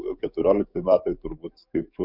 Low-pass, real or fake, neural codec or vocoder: 5.4 kHz; fake; codec, 44.1 kHz, 7.8 kbps, DAC